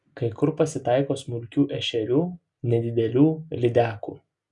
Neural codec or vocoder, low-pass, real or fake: none; 10.8 kHz; real